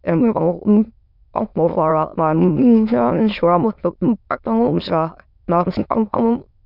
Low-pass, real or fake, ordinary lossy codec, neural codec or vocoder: 5.4 kHz; fake; none; autoencoder, 22.05 kHz, a latent of 192 numbers a frame, VITS, trained on many speakers